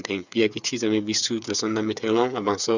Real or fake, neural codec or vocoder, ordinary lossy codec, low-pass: fake; codec, 16 kHz, 8 kbps, FreqCodec, smaller model; none; 7.2 kHz